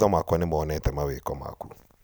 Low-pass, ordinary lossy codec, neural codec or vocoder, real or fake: none; none; vocoder, 44.1 kHz, 128 mel bands every 256 samples, BigVGAN v2; fake